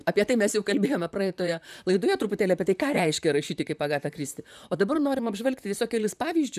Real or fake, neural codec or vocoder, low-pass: fake; vocoder, 44.1 kHz, 128 mel bands, Pupu-Vocoder; 14.4 kHz